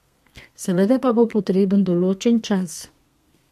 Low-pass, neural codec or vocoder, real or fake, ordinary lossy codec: 14.4 kHz; codec, 32 kHz, 1.9 kbps, SNAC; fake; MP3, 64 kbps